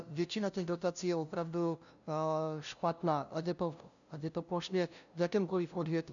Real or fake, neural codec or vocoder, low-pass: fake; codec, 16 kHz, 0.5 kbps, FunCodec, trained on LibriTTS, 25 frames a second; 7.2 kHz